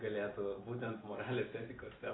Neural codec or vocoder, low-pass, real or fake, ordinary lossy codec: none; 7.2 kHz; real; AAC, 16 kbps